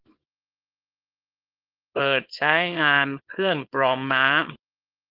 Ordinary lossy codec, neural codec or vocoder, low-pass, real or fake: Opus, 32 kbps; codec, 24 kHz, 0.9 kbps, WavTokenizer, small release; 5.4 kHz; fake